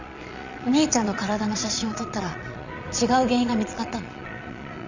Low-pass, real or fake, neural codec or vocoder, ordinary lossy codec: 7.2 kHz; fake; vocoder, 22.05 kHz, 80 mel bands, Vocos; none